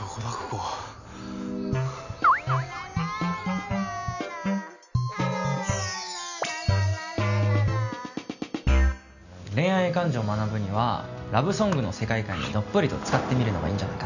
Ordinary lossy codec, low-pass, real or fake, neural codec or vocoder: none; 7.2 kHz; real; none